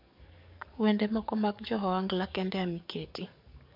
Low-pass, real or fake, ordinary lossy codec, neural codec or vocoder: 5.4 kHz; fake; MP3, 48 kbps; codec, 44.1 kHz, 7.8 kbps, DAC